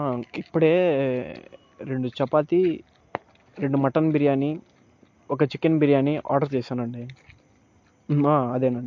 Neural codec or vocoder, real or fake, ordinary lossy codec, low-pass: none; real; MP3, 48 kbps; 7.2 kHz